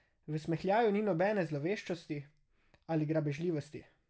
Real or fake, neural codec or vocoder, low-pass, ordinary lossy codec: real; none; none; none